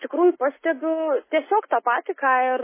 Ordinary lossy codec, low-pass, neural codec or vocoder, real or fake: MP3, 16 kbps; 3.6 kHz; none; real